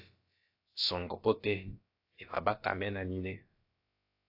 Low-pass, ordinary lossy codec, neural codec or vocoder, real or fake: 5.4 kHz; AAC, 32 kbps; codec, 16 kHz, about 1 kbps, DyCAST, with the encoder's durations; fake